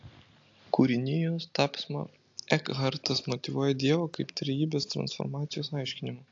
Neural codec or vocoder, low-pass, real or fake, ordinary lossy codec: none; 7.2 kHz; real; AAC, 48 kbps